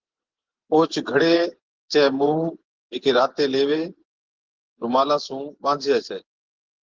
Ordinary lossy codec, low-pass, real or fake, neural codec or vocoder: Opus, 16 kbps; 7.2 kHz; fake; vocoder, 24 kHz, 100 mel bands, Vocos